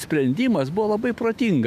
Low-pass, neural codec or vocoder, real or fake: 14.4 kHz; autoencoder, 48 kHz, 128 numbers a frame, DAC-VAE, trained on Japanese speech; fake